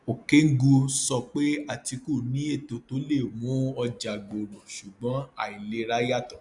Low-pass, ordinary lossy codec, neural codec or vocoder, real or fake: 10.8 kHz; none; none; real